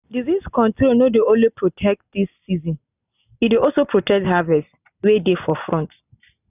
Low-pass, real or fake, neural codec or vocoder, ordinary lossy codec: 3.6 kHz; real; none; none